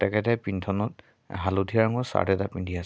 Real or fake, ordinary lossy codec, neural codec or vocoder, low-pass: real; none; none; none